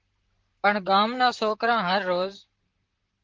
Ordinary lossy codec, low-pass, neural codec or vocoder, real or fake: Opus, 16 kbps; 7.2 kHz; vocoder, 44.1 kHz, 128 mel bands, Pupu-Vocoder; fake